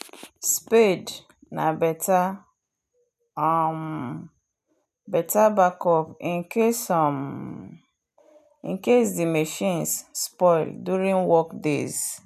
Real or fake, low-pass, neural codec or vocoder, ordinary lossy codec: real; 14.4 kHz; none; none